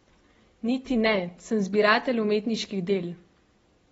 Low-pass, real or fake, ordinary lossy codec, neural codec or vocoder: 19.8 kHz; real; AAC, 24 kbps; none